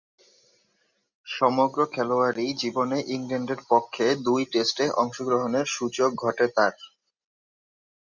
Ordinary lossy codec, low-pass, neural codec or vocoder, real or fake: Opus, 64 kbps; 7.2 kHz; none; real